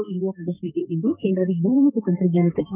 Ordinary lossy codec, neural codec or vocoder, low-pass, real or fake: none; codec, 32 kHz, 1.9 kbps, SNAC; 3.6 kHz; fake